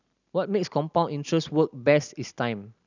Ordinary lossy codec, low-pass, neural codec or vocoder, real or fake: none; 7.2 kHz; none; real